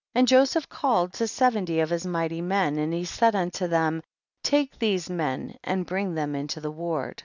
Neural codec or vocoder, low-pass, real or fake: none; 7.2 kHz; real